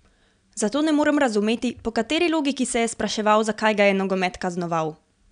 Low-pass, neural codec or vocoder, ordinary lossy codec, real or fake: 9.9 kHz; none; none; real